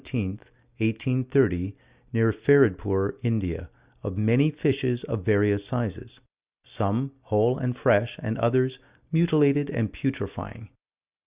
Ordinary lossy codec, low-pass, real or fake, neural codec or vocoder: Opus, 64 kbps; 3.6 kHz; real; none